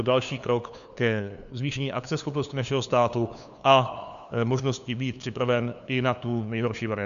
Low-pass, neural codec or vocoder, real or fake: 7.2 kHz; codec, 16 kHz, 2 kbps, FunCodec, trained on LibriTTS, 25 frames a second; fake